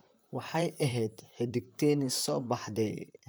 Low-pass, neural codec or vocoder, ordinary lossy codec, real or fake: none; vocoder, 44.1 kHz, 128 mel bands, Pupu-Vocoder; none; fake